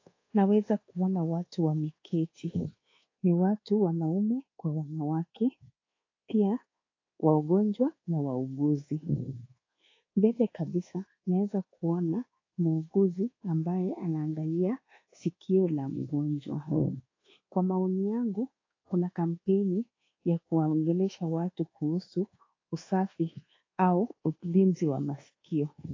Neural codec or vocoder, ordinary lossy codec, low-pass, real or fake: codec, 24 kHz, 1.2 kbps, DualCodec; AAC, 32 kbps; 7.2 kHz; fake